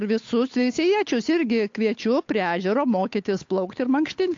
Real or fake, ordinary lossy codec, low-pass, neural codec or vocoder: fake; AAC, 48 kbps; 7.2 kHz; codec, 16 kHz, 8 kbps, FunCodec, trained on Chinese and English, 25 frames a second